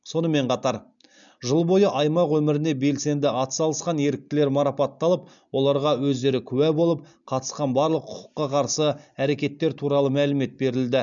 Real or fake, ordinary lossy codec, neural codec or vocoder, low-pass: real; MP3, 96 kbps; none; 7.2 kHz